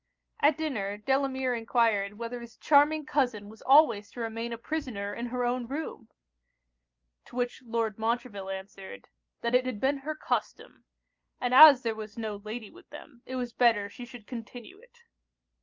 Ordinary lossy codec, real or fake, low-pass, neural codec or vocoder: Opus, 24 kbps; real; 7.2 kHz; none